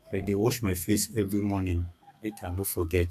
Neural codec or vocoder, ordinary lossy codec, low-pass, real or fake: codec, 32 kHz, 1.9 kbps, SNAC; none; 14.4 kHz; fake